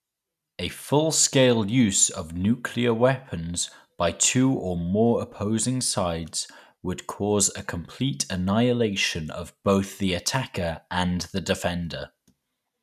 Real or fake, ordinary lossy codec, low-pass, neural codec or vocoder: real; none; 14.4 kHz; none